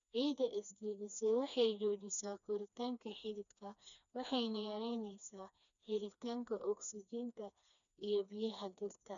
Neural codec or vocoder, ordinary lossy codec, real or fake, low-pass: codec, 16 kHz, 2 kbps, FreqCodec, smaller model; none; fake; 7.2 kHz